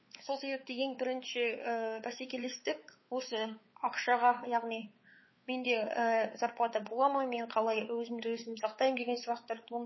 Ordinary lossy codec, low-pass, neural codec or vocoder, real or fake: MP3, 24 kbps; 7.2 kHz; codec, 16 kHz, 4 kbps, X-Codec, WavLM features, trained on Multilingual LibriSpeech; fake